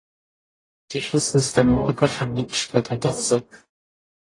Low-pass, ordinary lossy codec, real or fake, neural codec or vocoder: 10.8 kHz; AAC, 32 kbps; fake; codec, 44.1 kHz, 0.9 kbps, DAC